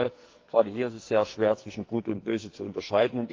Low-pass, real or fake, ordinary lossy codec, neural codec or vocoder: 7.2 kHz; fake; Opus, 24 kbps; codec, 44.1 kHz, 2.6 kbps, SNAC